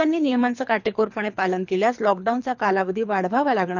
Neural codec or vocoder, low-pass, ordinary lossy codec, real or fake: codec, 24 kHz, 3 kbps, HILCodec; 7.2 kHz; none; fake